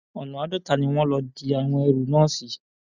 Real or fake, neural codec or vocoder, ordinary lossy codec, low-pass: real; none; none; 7.2 kHz